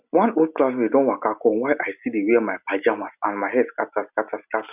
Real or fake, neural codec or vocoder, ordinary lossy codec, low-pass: real; none; none; 3.6 kHz